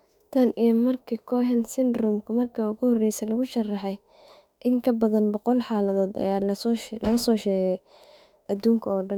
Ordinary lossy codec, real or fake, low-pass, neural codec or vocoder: none; fake; 19.8 kHz; autoencoder, 48 kHz, 32 numbers a frame, DAC-VAE, trained on Japanese speech